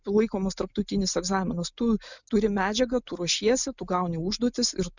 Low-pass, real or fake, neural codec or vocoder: 7.2 kHz; real; none